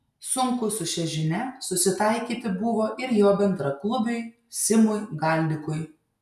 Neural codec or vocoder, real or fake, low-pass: none; real; 14.4 kHz